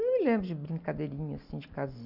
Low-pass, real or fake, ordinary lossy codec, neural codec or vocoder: 5.4 kHz; real; none; none